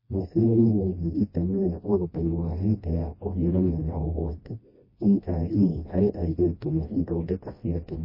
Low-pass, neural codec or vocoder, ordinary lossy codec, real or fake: 5.4 kHz; codec, 16 kHz, 1 kbps, FreqCodec, smaller model; MP3, 24 kbps; fake